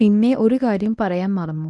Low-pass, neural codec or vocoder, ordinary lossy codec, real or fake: none; codec, 24 kHz, 0.9 kbps, WavTokenizer, medium speech release version 1; none; fake